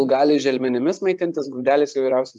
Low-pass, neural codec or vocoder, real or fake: 10.8 kHz; vocoder, 24 kHz, 100 mel bands, Vocos; fake